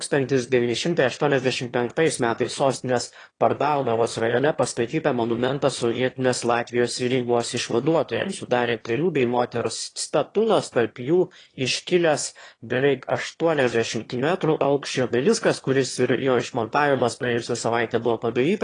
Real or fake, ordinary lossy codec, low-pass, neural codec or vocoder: fake; AAC, 32 kbps; 9.9 kHz; autoencoder, 22.05 kHz, a latent of 192 numbers a frame, VITS, trained on one speaker